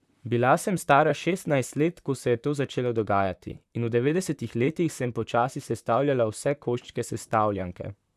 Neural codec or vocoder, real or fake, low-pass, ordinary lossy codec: vocoder, 44.1 kHz, 128 mel bands, Pupu-Vocoder; fake; 14.4 kHz; none